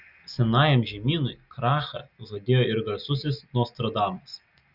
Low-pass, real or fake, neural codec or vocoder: 5.4 kHz; real; none